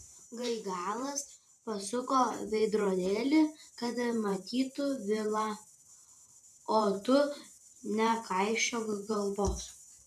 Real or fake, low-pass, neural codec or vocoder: fake; 14.4 kHz; vocoder, 44.1 kHz, 128 mel bands every 512 samples, BigVGAN v2